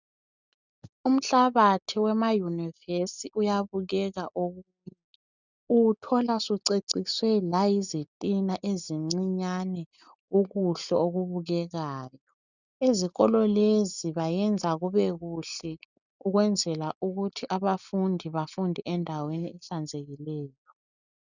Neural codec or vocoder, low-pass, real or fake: none; 7.2 kHz; real